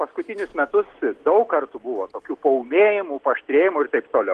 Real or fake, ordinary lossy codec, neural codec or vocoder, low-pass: real; Opus, 16 kbps; none; 10.8 kHz